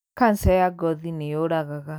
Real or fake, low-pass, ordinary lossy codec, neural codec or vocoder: real; none; none; none